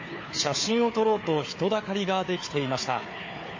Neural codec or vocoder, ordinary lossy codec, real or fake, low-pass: codec, 16 kHz, 4 kbps, FunCodec, trained on Chinese and English, 50 frames a second; MP3, 32 kbps; fake; 7.2 kHz